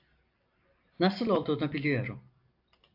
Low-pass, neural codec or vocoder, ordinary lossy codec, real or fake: 5.4 kHz; none; AAC, 48 kbps; real